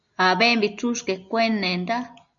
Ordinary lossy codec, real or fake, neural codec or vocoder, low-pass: MP3, 96 kbps; real; none; 7.2 kHz